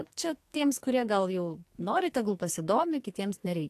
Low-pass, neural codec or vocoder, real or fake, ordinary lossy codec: 14.4 kHz; codec, 44.1 kHz, 2.6 kbps, SNAC; fake; AAC, 64 kbps